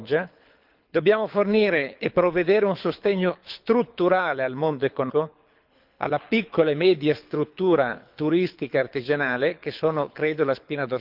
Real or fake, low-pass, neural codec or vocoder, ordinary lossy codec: fake; 5.4 kHz; codec, 24 kHz, 6 kbps, HILCodec; Opus, 24 kbps